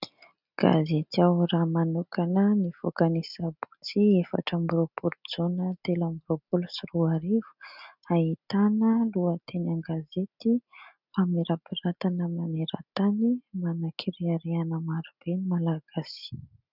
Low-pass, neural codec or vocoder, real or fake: 5.4 kHz; none; real